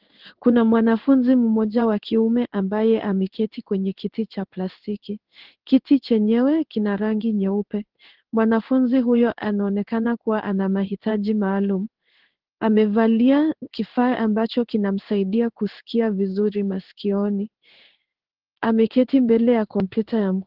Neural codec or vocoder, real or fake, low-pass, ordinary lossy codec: codec, 16 kHz in and 24 kHz out, 1 kbps, XY-Tokenizer; fake; 5.4 kHz; Opus, 16 kbps